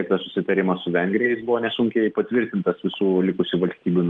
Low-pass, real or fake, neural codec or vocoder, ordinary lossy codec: 9.9 kHz; real; none; Opus, 24 kbps